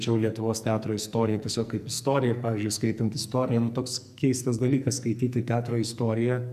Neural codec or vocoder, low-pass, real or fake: codec, 44.1 kHz, 2.6 kbps, SNAC; 14.4 kHz; fake